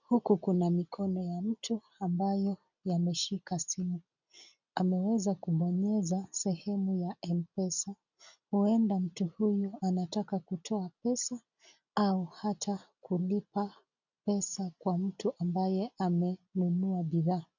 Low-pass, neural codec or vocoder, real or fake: 7.2 kHz; none; real